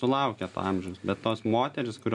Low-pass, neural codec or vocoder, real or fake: 10.8 kHz; none; real